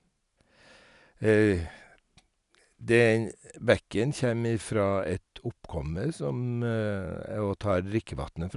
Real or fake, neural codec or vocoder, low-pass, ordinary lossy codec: real; none; 10.8 kHz; none